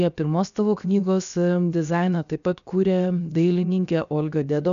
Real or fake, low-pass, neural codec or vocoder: fake; 7.2 kHz; codec, 16 kHz, 0.7 kbps, FocalCodec